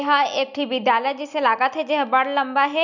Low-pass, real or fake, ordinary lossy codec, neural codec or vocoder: 7.2 kHz; real; none; none